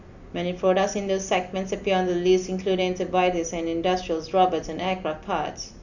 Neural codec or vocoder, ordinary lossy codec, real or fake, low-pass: none; none; real; 7.2 kHz